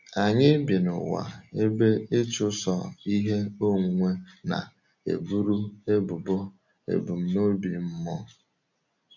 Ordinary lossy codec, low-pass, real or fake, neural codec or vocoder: none; 7.2 kHz; real; none